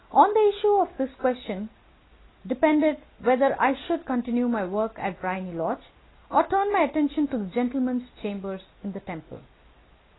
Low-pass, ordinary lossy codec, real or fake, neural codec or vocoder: 7.2 kHz; AAC, 16 kbps; real; none